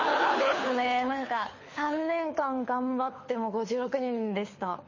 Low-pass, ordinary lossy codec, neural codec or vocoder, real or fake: 7.2 kHz; MP3, 32 kbps; codec, 24 kHz, 6 kbps, HILCodec; fake